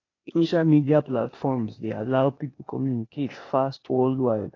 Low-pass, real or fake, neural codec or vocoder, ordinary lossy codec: 7.2 kHz; fake; codec, 16 kHz, 0.8 kbps, ZipCodec; AAC, 32 kbps